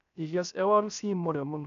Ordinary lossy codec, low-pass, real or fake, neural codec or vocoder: none; 7.2 kHz; fake; codec, 16 kHz, 0.3 kbps, FocalCodec